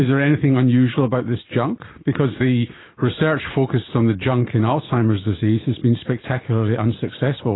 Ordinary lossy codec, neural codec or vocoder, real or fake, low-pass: AAC, 16 kbps; none; real; 7.2 kHz